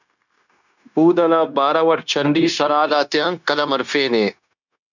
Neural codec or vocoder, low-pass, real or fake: codec, 16 kHz, 0.9 kbps, LongCat-Audio-Codec; 7.2 kHz; fake